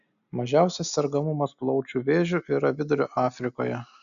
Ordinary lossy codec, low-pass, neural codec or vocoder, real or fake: AAC, 96 kbps; 7.2 kHz; none; real